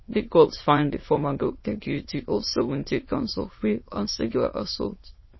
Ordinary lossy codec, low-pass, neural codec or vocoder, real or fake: MP3, 24 kbps; 7.2 kHz; autoencoder, 22.05 kHz, a latent of 192 numbers a frame, VITS, trained on many speakers; fake